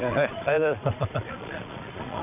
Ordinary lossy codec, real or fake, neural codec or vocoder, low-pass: none; fake; vocoder, 22.05 kHz, 80 mel bands, Vocos; 3.6 kHz